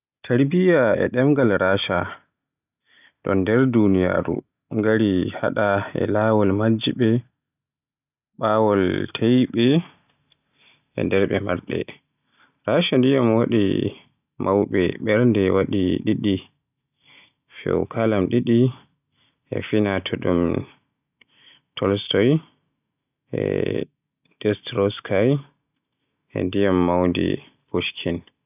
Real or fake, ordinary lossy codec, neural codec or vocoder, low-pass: real; none; none; 3.6 kHz